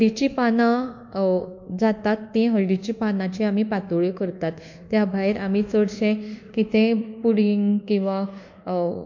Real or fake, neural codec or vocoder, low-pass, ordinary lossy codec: fake; codec, 24 kHz, 1.2 kbps, DualCodec; 7.2 kHz; MP3, 48 kbps